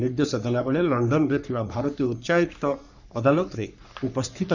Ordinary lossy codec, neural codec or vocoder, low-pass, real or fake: none; codec, 44.1 kHz, 3.4 kbps, Pupu-Codec; 7.2 kHz; fake